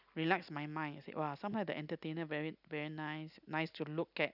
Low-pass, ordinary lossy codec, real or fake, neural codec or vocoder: 5.4 kHz; none; real; none